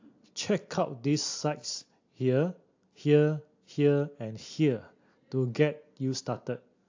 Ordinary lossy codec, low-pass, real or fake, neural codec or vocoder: AAC, 48 kbps; 7.2 kHz; real; none